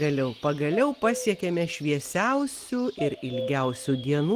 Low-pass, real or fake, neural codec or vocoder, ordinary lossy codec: 14.4 kHz; real; none; Opus, 24 kbps